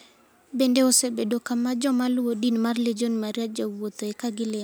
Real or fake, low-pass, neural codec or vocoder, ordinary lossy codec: real; none; none; none